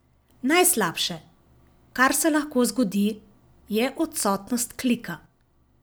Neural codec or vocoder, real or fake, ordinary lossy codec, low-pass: none; real; none; none